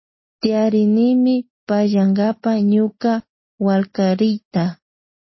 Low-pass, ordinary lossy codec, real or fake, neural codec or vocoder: 7.2 kHz; MP3, 24 kbps; real; none